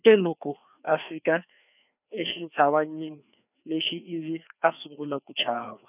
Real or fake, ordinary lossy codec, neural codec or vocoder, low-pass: fake; none; codec, 16 kHz, 2 kbps, FreqCodec, larger model; 3.6 kHz